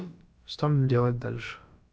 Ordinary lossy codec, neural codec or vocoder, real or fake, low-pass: none; codec, 16 kHz, about 1 kbps, DyCAST, with the encoder's durations; fake; none